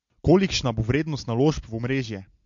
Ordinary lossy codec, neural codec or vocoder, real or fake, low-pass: MP3, 48 kbps; none; real; 7.2 kHz